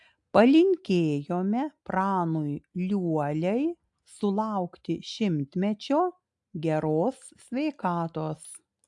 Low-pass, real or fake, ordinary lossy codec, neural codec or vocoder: 10.8 kHz; real; AAC, 64 kbps; none